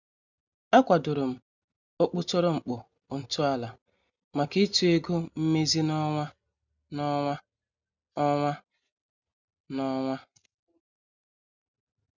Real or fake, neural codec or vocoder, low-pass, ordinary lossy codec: real; none; 7.2 kHz; none